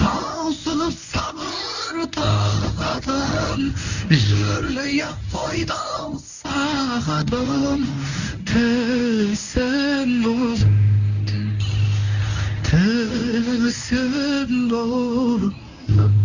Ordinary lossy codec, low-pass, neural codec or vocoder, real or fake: none; 7.2 kHz; codec, 24 kHz, 0.9 kbps, WavTokenizer, medium speech release version 1; fake